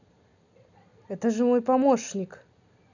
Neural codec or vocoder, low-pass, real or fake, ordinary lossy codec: none; 7.2 kHz; real; none